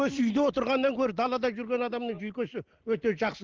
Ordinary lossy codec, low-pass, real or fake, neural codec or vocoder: Opus, 16 kbps; 7.2 kHz; real; none